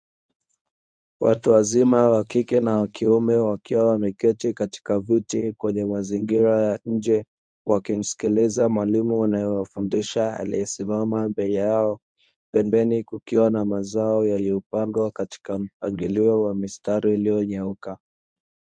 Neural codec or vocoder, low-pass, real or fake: codec, 24 kHz, 0.9 kbps, WavTokenizer, medium speech release version 1; 9.9 kHz; fake